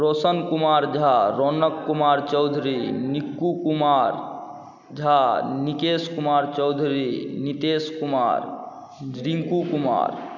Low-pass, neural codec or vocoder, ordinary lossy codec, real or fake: 7.2 kHz; none; none; real